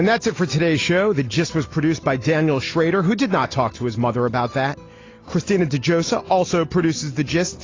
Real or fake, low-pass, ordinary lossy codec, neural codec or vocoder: real; 7.2 kHz; AAC, 32 kbps; none